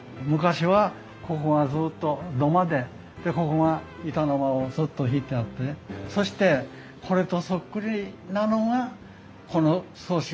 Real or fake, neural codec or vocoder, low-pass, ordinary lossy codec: real; none; none; none